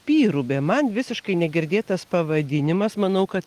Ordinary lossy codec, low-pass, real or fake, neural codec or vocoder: Opus, 32 kbps; 14.4 kHz; real; none